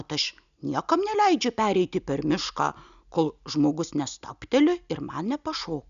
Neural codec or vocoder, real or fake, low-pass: none; real; 7.2 kHz